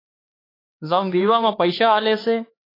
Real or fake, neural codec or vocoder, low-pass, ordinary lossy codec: fake; codec, 16 kHz, 4 kbps, X-Codec, HuBERT features, trained on LibriSpeech; 5.4 kHz; AAC, 24 kbps